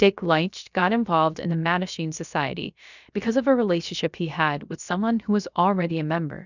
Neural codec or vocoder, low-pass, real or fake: codec, 16 kHz, about 1 kbps, DyCAST, with the encoder's durations; 7.2 kHz; fake